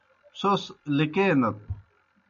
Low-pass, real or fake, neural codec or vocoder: 7.2 kHz; real; none